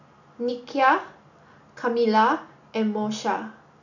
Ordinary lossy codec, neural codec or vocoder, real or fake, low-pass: none; none; real; 7.2 kHz